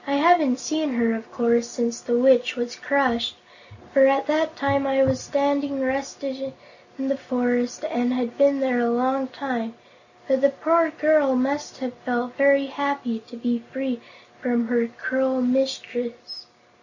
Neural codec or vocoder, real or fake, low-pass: none; real; 7.2 kHz